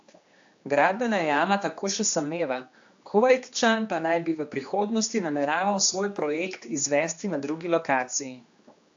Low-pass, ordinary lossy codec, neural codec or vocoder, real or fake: 7.2 kHz; AAC, 64 kbps; codec, 16 kHz, 2 kbps, X-Codec, HuBERT features, trained on general audio; fake